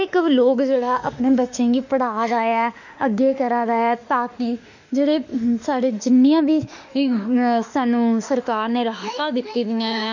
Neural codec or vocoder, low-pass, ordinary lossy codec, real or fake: autoencoder, 48 kHz, 32 numbers a frame, DAC-VAE, trained on Japanese speech; 7.2 kHz; none; fake